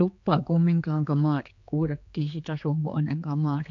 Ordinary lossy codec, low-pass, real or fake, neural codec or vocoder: AAC, 48 kbps; 7.2 kHz; fake; codec, 16 kHz, 4 kbps, X-Codec, HuBERT features, trained on general audio